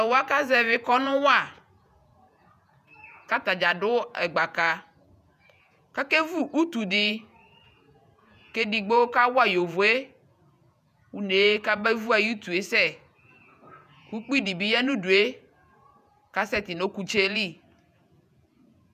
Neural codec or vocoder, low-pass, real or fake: vocoder, 48 kHz, 128 mel bands, Vocos; 14.4 kHz; fake